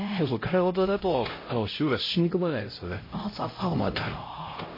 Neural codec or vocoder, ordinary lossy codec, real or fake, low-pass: codec, 16 kHz, 0.5 kbps, X-Codec, HuBERT features, trained on LibriSpeech; MP3, 24 kbps; fake; 5.4 kHz